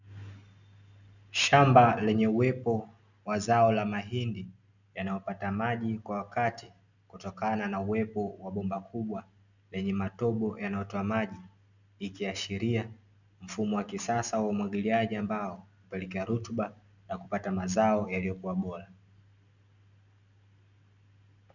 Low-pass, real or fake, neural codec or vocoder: 7.2 kHz; real; none